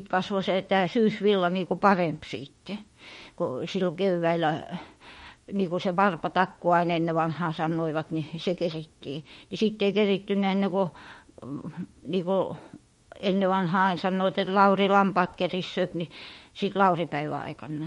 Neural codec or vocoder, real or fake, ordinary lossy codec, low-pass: autoencoder, 48 kHz, 32 numbers a frame, DAC-VAE, trained on Japanese speech; fake; MP3, 48 kbps; 19.8 kHz